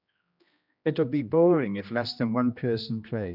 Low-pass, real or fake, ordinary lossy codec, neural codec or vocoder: 5.4 kHz; fake; none; codec, 16 kHz, 1 kbps, X-Codec, HuBERT features, trained on general audio